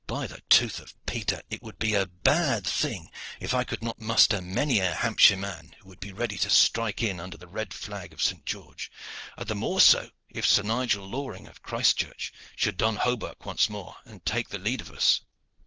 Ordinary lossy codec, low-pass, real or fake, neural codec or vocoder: Opus, 16 kbps; 7.2 kHz; real; none